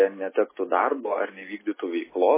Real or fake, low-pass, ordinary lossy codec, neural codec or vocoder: real; 3.6 kHz; MP3, 16 kbps; none